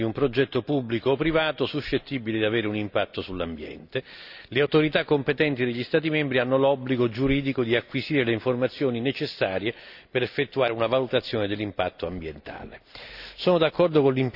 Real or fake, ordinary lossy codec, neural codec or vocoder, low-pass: real; none; none; 5.4 kHz